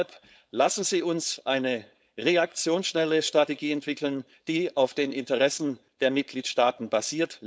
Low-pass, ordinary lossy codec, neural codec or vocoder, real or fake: none; none; codec, 16 kHz, 4.8 kbps, FACodec; fake